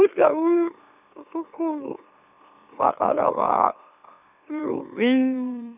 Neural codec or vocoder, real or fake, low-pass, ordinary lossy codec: autoencoder, 44.1 kHz, a latent of 192 numbers a frame, MeloTTS; fake; 3.6 kHz; none